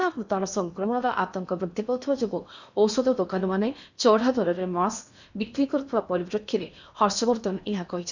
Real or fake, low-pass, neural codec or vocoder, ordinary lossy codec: fake; 7.2 kHz; codec, 16 kHz in and 24 kHz out, 0.8 kbps, FocalCodec, streaming, 65536 codes; none